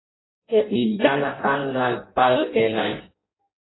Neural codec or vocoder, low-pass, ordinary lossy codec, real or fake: codec, 16 kHz in and 24 kHz out, 0.6 kbps, FireRedTTS-2 codec; 7.2 kHz; AAC, 16 kbps; fake